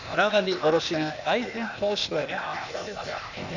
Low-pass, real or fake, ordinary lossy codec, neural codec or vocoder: 7.2 kHz; fake; none; codec, 16 kHz, 0.8 kbps, ZipCodec